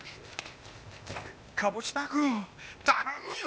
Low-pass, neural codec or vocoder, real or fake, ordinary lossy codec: none; codec, 16 kHz, 0.8 kbps, ZipCodec; fake; none